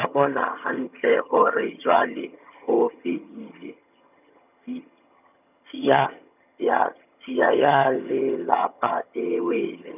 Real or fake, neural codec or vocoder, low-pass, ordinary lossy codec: fake; vocoder, 22.05 kHz, 80 mel bands, HiFi-GAN; 3.6 kHz; none